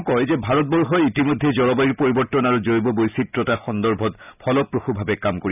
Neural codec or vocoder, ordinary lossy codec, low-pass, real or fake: none; none; 3.6 kHz; real